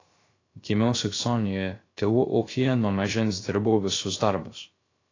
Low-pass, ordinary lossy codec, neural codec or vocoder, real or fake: 7.2 kHz; AAC, 32 kbps; codec, 16 kHz, 0.3 kbps, FocalCodec; fake